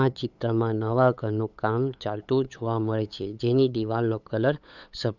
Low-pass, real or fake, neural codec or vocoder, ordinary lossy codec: 7.2 kHz; fake; codec, 16 kHz, 8 kbps, FunCodec, trained on LibriTTS, 25 frames a second; none